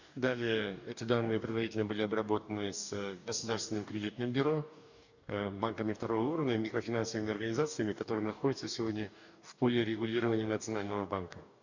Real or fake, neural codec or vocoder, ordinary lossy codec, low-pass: fake; codec, 44.1 kHz, 2.6 kbps, DAC; none; 7.2 kHz